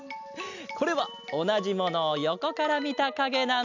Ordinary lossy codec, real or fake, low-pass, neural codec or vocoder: none; real; 7.2 kHz; none